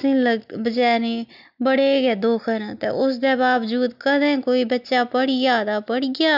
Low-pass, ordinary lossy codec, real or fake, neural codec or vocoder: 5.4 kHz; none; real; none